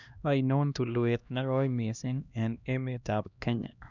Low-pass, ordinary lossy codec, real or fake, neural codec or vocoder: 7.2 kHz; none; fake; codec, 16 kHz, 1 kbps, X-Codec, HuBERT features, trained on LibriSpeech